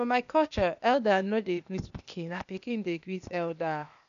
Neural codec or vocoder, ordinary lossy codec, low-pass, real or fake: codec, 16 kHz, 0.8 kbps, ZipCodec; none; 7.2 kHz; fake